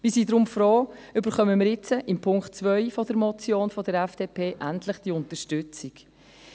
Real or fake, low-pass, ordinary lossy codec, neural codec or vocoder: real; none; none; none